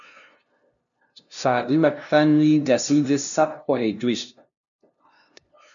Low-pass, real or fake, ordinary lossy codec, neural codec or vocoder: 7.2 kHz; fake; MP3, 96 kbps; codec, 16 kHz, 0.5 kbps, FunCodec, trained on LibriTTS, 25 frames a second